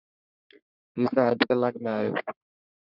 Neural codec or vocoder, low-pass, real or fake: codec, 44.1 kHz, 3.4 kbps, Pupu-Codec; 5.4 kHz; fake